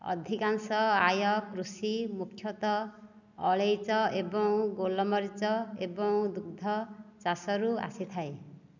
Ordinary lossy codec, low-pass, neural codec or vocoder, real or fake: none; 7.2 kHz; none; real